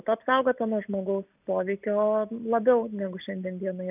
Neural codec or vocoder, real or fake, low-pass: none; real; 3.6 kHz